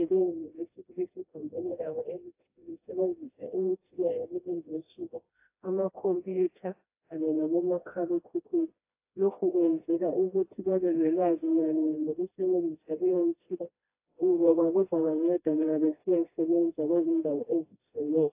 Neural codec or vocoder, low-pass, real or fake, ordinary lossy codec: codec, 16 kHz, 1 kbps, FreqCodec, smaller model; 3.6 kHz; fake; AAC, 24 kbps